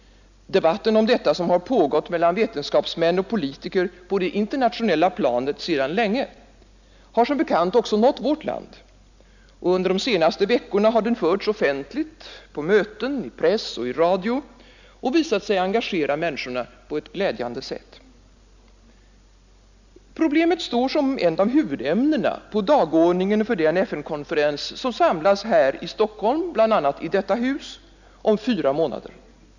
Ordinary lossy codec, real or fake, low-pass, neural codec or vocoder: none; real; 7.2 kHz; none